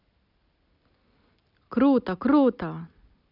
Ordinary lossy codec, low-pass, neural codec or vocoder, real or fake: none; 5.4 kHz; none; real